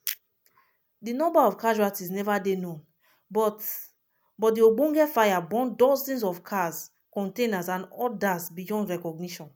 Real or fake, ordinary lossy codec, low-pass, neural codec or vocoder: real; none; none; none